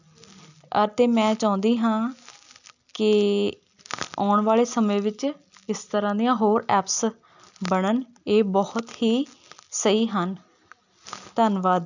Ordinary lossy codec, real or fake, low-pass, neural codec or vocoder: none; real; 7.2 kHz; none